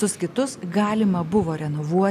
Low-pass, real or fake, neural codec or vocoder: 14.4 kHz; real; none